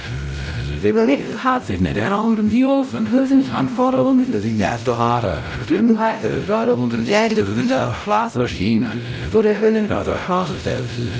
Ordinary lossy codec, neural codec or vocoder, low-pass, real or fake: none; codec, 16 kHz, 0.5 kbps, X-Codec, WavLM features, trained on Multilingual LibriSpeech; none; fake